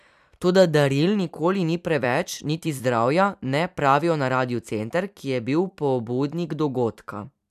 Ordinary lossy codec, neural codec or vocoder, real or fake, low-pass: none; vocoder, 44.1 kHz, 128 mel bands every 256 samples, BigVGAN v2; fake; 14.4 kHz